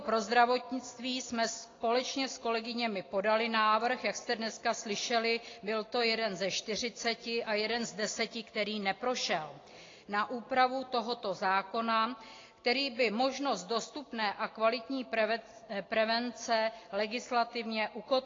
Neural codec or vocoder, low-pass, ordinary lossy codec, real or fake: none; 7.2 kHz; AAC, 32 kbps; real